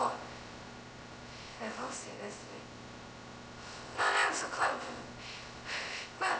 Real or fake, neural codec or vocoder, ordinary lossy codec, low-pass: fake; codec, 16 kHz, 0.2 kbps, FocalCodec; none; none